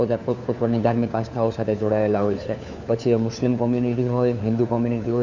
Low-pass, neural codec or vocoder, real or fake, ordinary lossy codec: 7.2 kHz; codec, 16 kHz, 4 kbps, FunCodec, trained on LibriTTS, 50 frames a second; fake; none